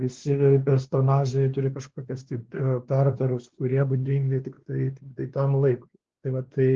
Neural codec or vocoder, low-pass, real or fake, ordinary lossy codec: codec, 16 kHz, 2 kbps, X-Codec, WavLM features, trained on Multilingual LibriSpeech; 7.2 kHz; fake; Opus, 16 kbps